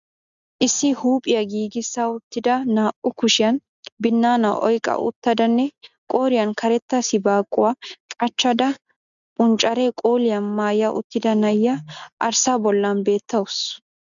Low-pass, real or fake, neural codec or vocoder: 7.2 kHz; real; none